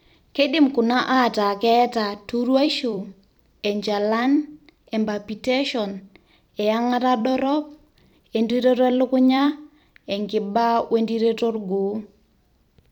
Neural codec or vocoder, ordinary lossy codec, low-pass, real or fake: vocoder, 44.1 kHz, 128 mel bands every 256 samples, BigVGAN v2; none; 19.8 kHz; fake